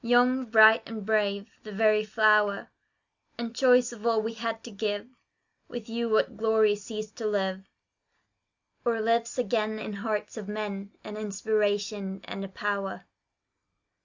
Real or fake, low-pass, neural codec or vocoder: real; 7.2 kHz; none